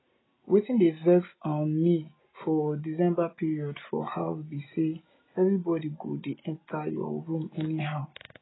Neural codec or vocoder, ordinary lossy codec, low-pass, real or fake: none; AAC, 16 kbps; 7.2 kHz; real